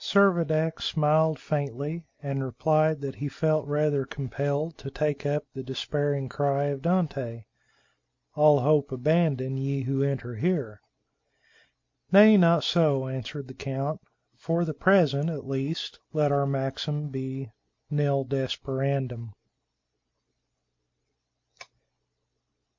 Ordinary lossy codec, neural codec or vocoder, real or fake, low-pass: MP3, 48 kbps; none; real; 7.2 kHz